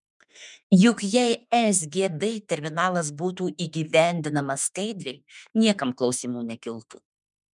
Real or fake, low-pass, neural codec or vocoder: fake; 10.8 kHz; autoencoder, 48 kHz, 32 numbers a frame, DAC-VAE, trained on Japanese speech